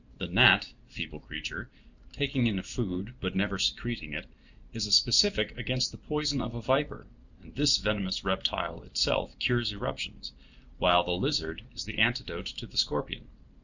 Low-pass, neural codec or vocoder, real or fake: 7.2 kHz; vocoder, 44.1 kHz, 128 mel bands every 512 samples, BigVGAN v2; fake